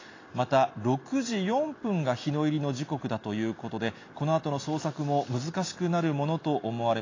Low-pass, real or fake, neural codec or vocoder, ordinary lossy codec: 7.2 kHz; real; none; AAC, 32 kbps